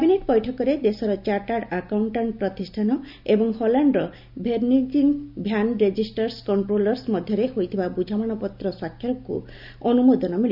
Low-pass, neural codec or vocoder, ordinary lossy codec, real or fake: 5.4 kHz; none; none; real